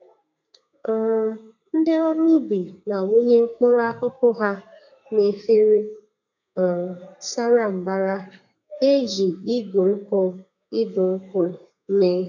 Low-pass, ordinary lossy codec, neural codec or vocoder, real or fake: 7.2 kHz; none; codec, 32 kHz, 1.9 kbps, SNAC; fake